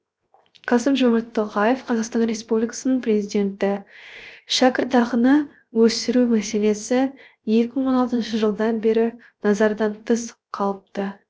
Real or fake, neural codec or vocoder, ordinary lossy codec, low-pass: fake; codec, 16 kHz, 0.7 kbps, FocalCodec; none; none